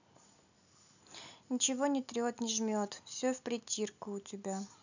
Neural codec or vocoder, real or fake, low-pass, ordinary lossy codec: none; real; 7.2 kHz; none